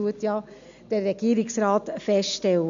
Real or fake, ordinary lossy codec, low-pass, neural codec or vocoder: real; MP3, 64 kbps; 7.2 kHz; none